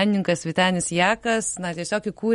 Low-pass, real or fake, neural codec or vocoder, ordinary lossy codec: 19.8 kHz; real; none; MP3, 48 kbps